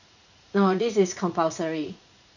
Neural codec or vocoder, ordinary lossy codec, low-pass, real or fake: vocoder, 44.1 kHz, 80 mel bands, Vocos; none; 7.2 kHz; fake